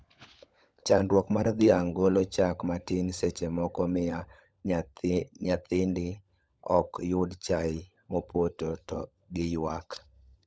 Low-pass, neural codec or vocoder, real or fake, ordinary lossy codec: none; codec, 16 kHz, 16 kbps, FunCodec, trained on LibriTTS, 50 frames a second; fake; none